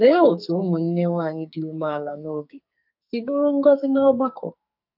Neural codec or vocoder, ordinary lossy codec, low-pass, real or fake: codec, 44.1 kHz, 2.6 kbps, SNAC; none; 5.4 kHz; fake